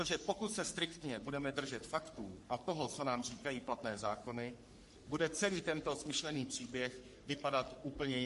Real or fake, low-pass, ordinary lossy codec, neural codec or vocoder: fake; 14.4 kHz; MP3, 48 kbps; codec, 44.1 kHz, 3.4 kbps, Pupu-Codec